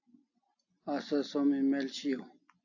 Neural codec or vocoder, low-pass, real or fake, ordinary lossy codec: none; 7.2 kHz; real; AAC, 48 kbps